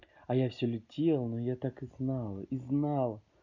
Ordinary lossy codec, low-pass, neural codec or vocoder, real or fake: none; 7.2 kHz; none; real